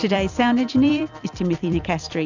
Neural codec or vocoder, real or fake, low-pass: vocoder, 44.1 kHz, 128 mel bands every 256 samples, BigVGAN v2; fake; 7.2 kHz